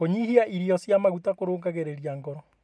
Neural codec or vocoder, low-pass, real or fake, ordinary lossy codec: none; none; real; none